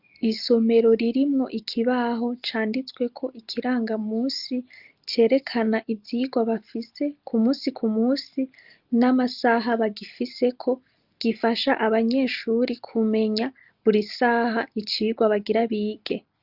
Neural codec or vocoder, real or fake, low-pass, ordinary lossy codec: none; real; 5.4 kHz; Opus, 32 kbps